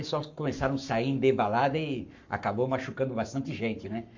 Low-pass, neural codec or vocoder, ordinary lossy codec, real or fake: 7.2 kHz; codec, 44.1 kHz, 7.8 kbps, Pupu-Codec; MP3, 64 kbps; fake